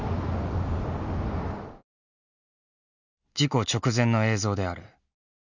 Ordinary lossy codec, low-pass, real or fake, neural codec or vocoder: none; 7.2 kHz; real; none